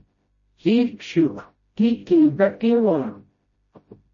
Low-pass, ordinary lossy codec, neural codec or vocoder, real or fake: 7.2 kHz; MP3, 32 kbps; codec, 16 kHz, 0.5 kbps, FreqCodec, smaller model; fake